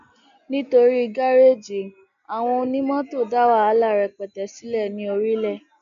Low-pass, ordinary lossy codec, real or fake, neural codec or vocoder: 7.2 kHz; none; real; none